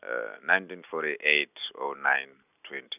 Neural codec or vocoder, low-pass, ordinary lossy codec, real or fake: none; 3.6 kHz; none; real